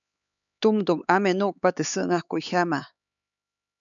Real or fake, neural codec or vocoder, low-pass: fake; codec, 16 kHz, 4 kbps, X-Codec, HuBERT features, trained on LibriSpeech; 7.2 kHz